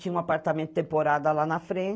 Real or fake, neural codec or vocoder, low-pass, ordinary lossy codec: real; none; none; none